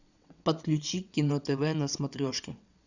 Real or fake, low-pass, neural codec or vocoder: fake; 7.2 kHz; vocoder, 22.05 kHz, 80 mel bands, WaveNeXt